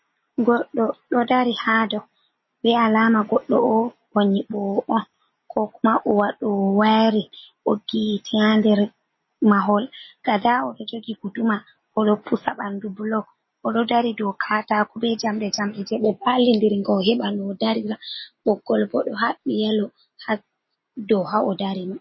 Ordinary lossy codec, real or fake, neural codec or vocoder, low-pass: MP3, 24 kbps; real; none; 7.2 kHz